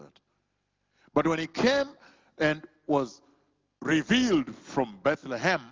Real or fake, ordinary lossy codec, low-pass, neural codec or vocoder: real; Opus, 32 kbps; 7.2 kHz; none